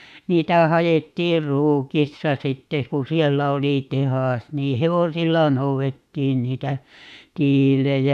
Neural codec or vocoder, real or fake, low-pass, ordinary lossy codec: autoencoder, 48 kHz, 32 numbers a frame, DAC-VAE, trained on Japanese speech; fake; 14.4 kHz; none